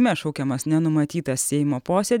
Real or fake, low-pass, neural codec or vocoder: real; 19.8 kHz; none